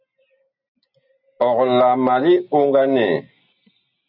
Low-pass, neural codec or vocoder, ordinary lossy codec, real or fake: 5.4 kHz; none; AAC, 48 kbps; real